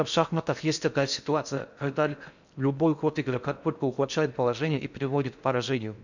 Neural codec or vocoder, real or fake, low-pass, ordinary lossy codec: codec, 16 kHz in and 24 kHz out, 0.6 kbps, FocalCodec, streaming, 4096 codes; fake; 7.2 kHz; none